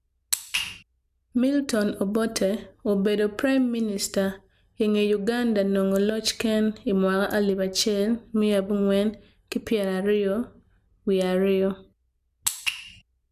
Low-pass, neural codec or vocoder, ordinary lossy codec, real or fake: 14.4 kHz; none; none; real